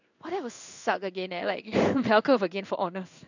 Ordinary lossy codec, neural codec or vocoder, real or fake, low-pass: none; codec, 16 kHz in and 24 kHz out, 1 kbps, XY-Tokenizer; fake; 7.2 kHz